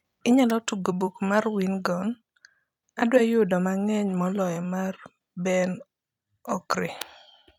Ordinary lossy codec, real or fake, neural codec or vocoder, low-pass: none; fake; vocoder, 44.1 kHz, 128 mel bands every 256 samples, BigVGAN v2; 19.8 kHz